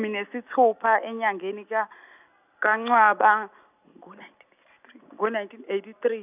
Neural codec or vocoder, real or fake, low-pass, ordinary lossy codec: none; real; 3.6 kHz; none